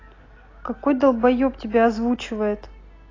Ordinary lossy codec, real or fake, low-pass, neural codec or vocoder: AAC, 32 kbps; real; 7.2 kHz; none